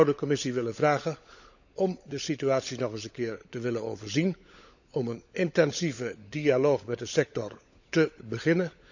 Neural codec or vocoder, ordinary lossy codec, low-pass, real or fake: codec, 16 kHz, 16 kbps, FunCodec, trained on LibriTTS, 50 frames a second; none; 7.2 kHz; fake